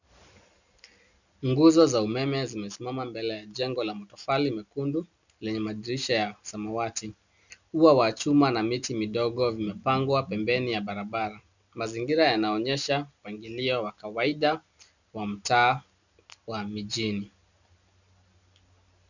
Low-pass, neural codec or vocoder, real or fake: 7.2 kHz; none; real